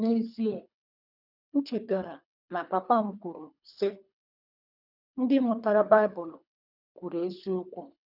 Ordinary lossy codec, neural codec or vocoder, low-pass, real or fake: none; codec, 24 kHz, 3 kbps, HILCodec; 5.4 kHz; fake